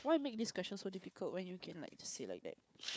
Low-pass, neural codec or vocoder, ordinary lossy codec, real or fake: none; codec, 16 kHz, 4 kbps, FunCodec, trained on Chinese and English, 50 frames a second; none; fake